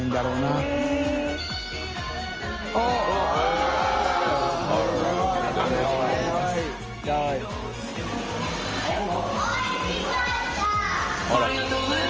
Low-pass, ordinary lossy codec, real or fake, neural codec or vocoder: 7.2 kHz; Opus, 24 kbps; real; none